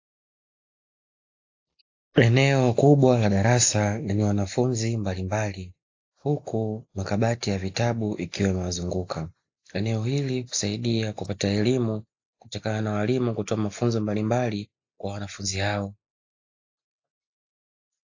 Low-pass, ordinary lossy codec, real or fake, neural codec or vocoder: 7.2 kHz; AAC, 48 kbps; real; none